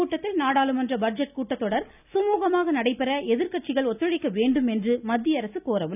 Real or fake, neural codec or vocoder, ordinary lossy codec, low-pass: real; none; none; 3.6 kHz